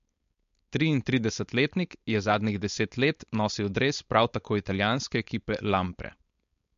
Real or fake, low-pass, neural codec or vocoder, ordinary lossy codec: fake; 7.2 kHz; codec, 16 kHz, 4.8 kbps, FACodec; MP3, 48 kbps